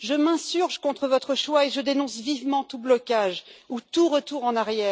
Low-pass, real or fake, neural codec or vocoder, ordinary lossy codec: none; real; none; none